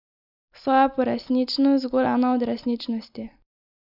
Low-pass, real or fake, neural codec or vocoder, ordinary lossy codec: 5.4 kHz; real; none; none